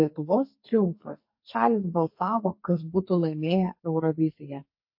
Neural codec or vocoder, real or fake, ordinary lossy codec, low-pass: codec, 44.1 kHz, 2.6 kbps, SNAC; fake; MP3, 32 kbps; 5.4 kHz